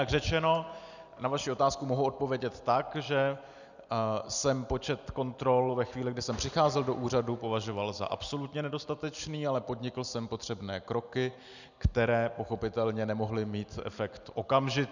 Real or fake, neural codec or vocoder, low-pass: real; none; 7.2 kHz